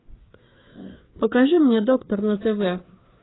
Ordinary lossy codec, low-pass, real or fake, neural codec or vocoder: AAC, 16 kbps; 7.2 kHz; fake; codec, 16 kHz, 4 kbps, FreqCodec, larger model